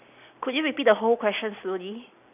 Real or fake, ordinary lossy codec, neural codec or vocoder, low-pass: real; none; none; 3.6 kHz